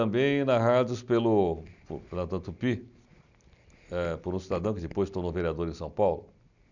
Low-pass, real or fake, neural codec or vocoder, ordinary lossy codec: 7.2 kHz; real; none; none